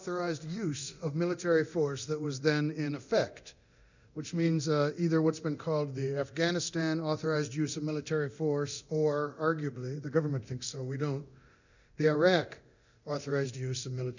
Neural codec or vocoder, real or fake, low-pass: codec, 24 kHz, 0.9 kbps, DualCodec; fake; 7.2 kHz